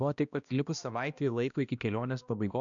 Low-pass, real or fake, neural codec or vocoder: 7.2 kHz; fake; codec, 16 kHz, 1 kbps, X-Codec, HuBERT features, trained on balanced general audio